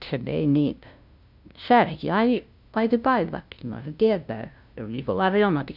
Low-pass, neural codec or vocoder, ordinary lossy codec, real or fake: 5.4 kHz; codec, 16 kHz, 0.5 kbps, FunCodec, trained on LibriTTS, 25 frames a second; none; fake